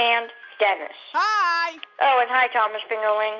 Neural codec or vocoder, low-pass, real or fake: none; 7.2 kHz; real